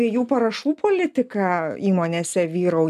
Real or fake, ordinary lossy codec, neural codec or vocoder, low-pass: real; MP3, 96 kbps; none; 14.4 kHz